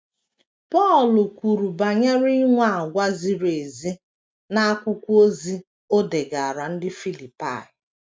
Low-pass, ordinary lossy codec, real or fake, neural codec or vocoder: none; none; real; none